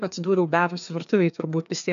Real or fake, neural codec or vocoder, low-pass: fake; codec, 16 kHz, 2 kbps, FreqCodec, larger model; 7.2 kHz